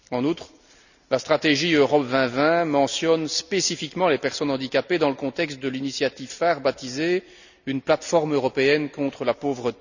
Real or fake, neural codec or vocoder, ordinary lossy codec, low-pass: real; none; none; 7.2 kHz